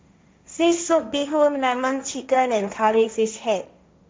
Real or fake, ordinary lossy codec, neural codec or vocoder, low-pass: fake; none; codec, 16 kHz, 1.1 kbps, Voila-Tokenizer; none